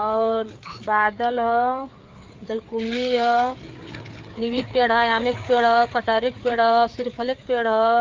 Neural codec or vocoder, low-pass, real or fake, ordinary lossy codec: codec, 16 kHz, 4 kbps, FreqCodec, larger model; 7.2 kHz; fake; Opus, 24 kbps